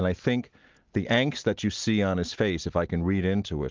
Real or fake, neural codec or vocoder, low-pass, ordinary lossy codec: real; none; 7.2 kHz; Opus, 32 kbps